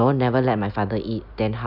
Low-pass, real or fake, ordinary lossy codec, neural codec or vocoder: 5.4 kHz; real; none; none